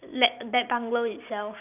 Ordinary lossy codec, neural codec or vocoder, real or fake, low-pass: none; none; real; 3.6 kHz